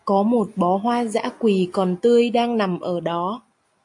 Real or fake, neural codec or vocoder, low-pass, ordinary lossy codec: real; none; 10.8 kHz; AAC, 64 kbps